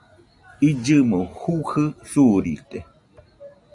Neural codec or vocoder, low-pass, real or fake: none; 10.8 kHz; real